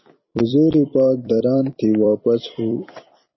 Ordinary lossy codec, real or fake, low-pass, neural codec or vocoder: MP3, 24 kbps; fake; 7.2 kHz; vocoder, 44.1 kHz, 128 mel bands every 256 samples, BigVGAN v2